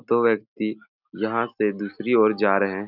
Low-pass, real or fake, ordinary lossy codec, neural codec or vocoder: 5.4 kHz; real; none; none